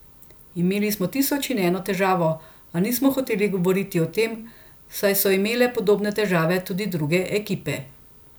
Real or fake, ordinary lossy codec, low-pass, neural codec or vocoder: real; none; none; none